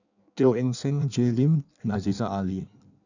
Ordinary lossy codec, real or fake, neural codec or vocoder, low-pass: none; fake; codec, 16 kHz in and 24 kHz out, 1.1 kbps, FireRedTTS-2 codec; 7.2 kHz